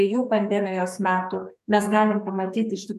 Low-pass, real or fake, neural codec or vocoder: 14.4 kHz; fake; codec, 32 kHz, 1.9 kbps, SNAC